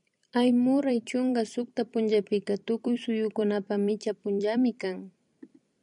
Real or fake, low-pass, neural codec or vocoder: fake; 10.8 kHz; vocoder, 44.1 kHz, 128 mel bands every 256 samples, BigVGAN v2